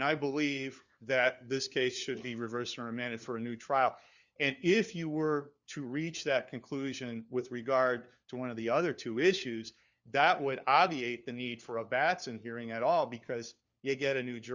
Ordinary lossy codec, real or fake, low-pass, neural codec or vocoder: Opus, 64 kbps; fake; 7.2 kHz; codec, 16 kHz, 4 kbps, FunCodec, trained on LibriTTS, 50 frames a second